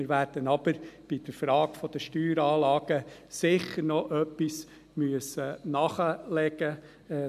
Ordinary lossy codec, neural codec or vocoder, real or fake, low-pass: AAC, 96 kbps; none; real; 14.4 kHz